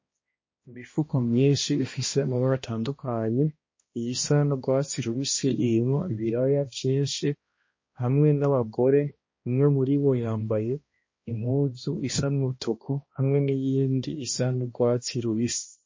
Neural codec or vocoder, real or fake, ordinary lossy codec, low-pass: codec, 16 kHz, 1 kbps, X-Codec, HuBERT features, trained on balanced general audio; fake; MP3, 32 kbps; 7.2 kHz